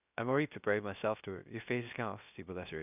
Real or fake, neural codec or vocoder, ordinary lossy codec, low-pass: fake; codec, 16 kHz, 0.3 kbps, FocalCodec; none; 3.6 kHz